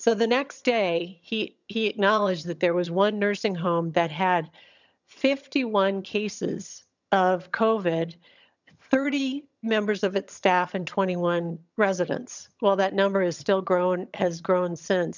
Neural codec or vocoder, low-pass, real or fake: vocoder, 22.05 kHz, 80 mel bands, HiFi-GAN; 7.2 kHz; fake